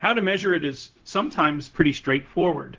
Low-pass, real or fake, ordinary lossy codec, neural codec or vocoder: 7.2 kHz; fake; Opus, 16 kbps; codec, 16 kHz, 0.4 kbps, LongCat-Audio-Codec